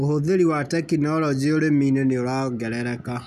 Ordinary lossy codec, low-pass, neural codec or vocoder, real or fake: none; 14.4 kHz; none; real